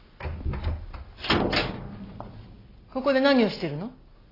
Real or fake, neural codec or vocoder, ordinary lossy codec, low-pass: real; none; AAC, 24 kbps; 5.4 kHz